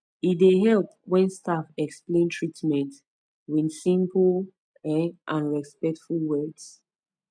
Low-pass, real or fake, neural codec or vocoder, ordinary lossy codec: 9.9 kHz; real; none; none